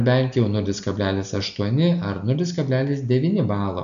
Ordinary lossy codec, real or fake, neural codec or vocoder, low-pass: MP3, 96 kbps; real; none; 7.2 kHz